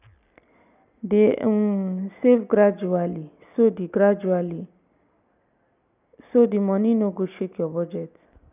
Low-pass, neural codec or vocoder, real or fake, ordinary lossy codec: 3.6 kHz; none; real; none